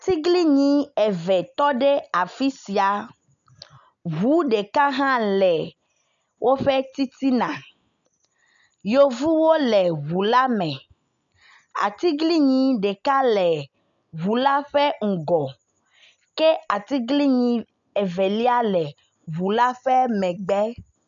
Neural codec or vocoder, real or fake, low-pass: none; real; 7.2 kHz